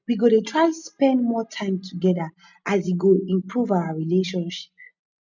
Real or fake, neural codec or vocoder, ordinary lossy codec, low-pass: real; none; none; 7.2 kHz